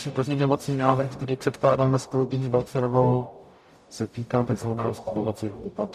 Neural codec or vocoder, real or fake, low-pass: codec, 44.1 kHz, 0.9 kbps, DAC; fake; 14.4 kHz